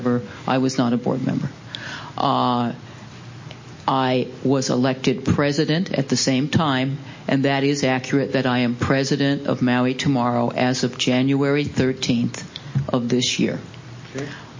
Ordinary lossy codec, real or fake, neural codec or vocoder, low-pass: MP3, 32 kbps; real; none; 7.2 kHz